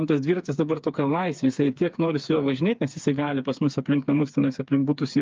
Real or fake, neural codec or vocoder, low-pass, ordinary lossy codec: fake; codec, 16 kHz, 4 kbps, FreqCodec, smaller model; 7.2 kHz; Opus, 24 kbps